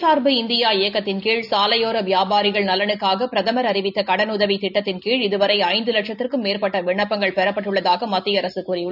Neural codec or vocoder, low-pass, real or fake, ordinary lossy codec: none; 5.4 kHz; real; none